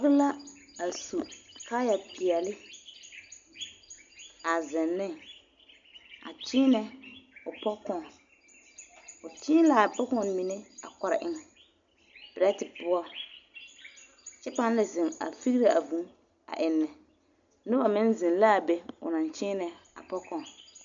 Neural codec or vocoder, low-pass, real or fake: none; 7.2 kHz; real